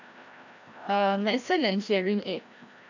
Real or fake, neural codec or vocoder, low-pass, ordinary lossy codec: fake; codec, 16 kHz, 1 kbps, FreqCodec, larger model; 7.2 kHz; none